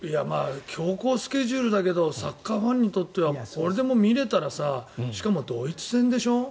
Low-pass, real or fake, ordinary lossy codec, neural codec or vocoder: none; real; none; none